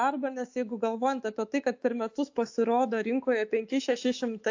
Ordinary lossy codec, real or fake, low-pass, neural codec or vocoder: AAC, 48 kbps; fake; 7.2 kHz; autoencoder, 48 kHz, 128 numbers a frame, DAC-VAE, trained on Japanese speech